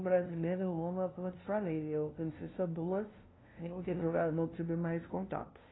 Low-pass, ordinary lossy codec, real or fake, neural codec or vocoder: 7.2 kHz; AAC, 16 kbps; fake; codec, 16 kHz, 0.5 kbps, FunCodec, trained on LibriTTS, 25 frames a second